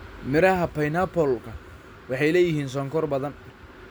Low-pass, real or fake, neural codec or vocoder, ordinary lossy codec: none; real; none; none